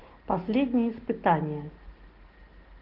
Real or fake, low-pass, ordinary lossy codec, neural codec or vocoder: real; 5.4 kHz; Opus, 16 kbps; none